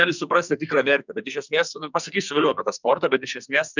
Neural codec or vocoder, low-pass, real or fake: codec, 44.1 kHz, 2.6 kbps, SNAC; 7.2 kHz; fake